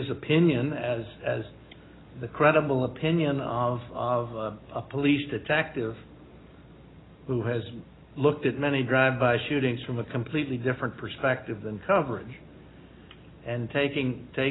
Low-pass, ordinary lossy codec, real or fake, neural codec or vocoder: 7.2 kHz; AAC, 16 kbps; real; none